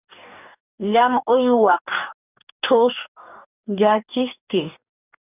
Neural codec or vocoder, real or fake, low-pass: codec, 44.1 kHz, 2.6 kbps, DAC; fake; 3.6 kHz